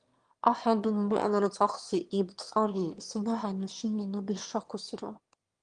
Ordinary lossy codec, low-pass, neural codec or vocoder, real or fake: Opus, 24 kbps; 9.9 kHz; autoencoder, 22.05 kHz, a latent of 192 numbers a frame, VITS, trained on one speaker; fake